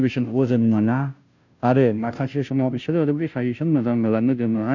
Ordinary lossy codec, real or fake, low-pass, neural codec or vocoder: none; fake; 7.2 kHz; codec, 16 kHz, 0.5 kbps, FunCodec, trained on Chinese and English, 25 frames a second